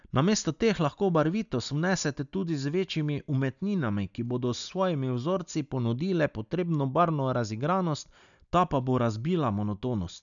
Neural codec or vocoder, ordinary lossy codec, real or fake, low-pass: none; none; real; 7.2 kHz